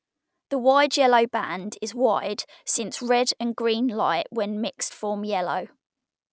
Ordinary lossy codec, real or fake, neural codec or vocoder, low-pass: none; real; none; none